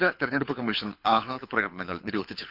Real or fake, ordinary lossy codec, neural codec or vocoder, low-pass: fake; Opus, 64 kbps; codec, 24 kHz, 6 kbps, HILCodec; 5.4 kHz